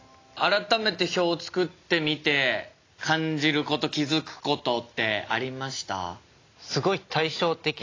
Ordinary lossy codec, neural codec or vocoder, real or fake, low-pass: AAC, 32 kbps; none; real; 7.2 kHz